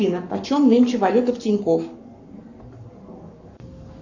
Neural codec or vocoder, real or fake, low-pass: codec, 44.1 kHz, 7.8 kbps, Pupu-Codec; fake; 7.2 kHz